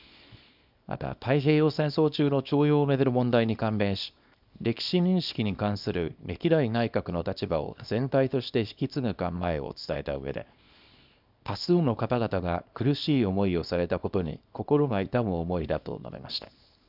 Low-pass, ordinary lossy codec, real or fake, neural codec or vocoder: 5.4 kHz; none; fake; codec, 24 kHz, 0.9 kbps, WavTokenizer, small release